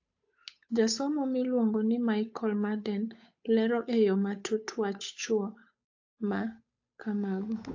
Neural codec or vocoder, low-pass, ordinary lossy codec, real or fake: codec, 16 kHz, 8 kbps, FunCodec, trained on Chinese and English, 25 frames a second; 7.2 kHz; AAC, 48 kbps; fake